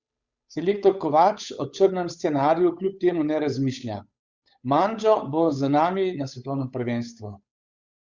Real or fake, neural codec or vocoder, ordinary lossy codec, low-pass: fake; codec, 16 kHz, 8 kbps, FunCodec, trained on Chinese and English, 25 frames a second; Opus, 64 kbps; 7.2 kHz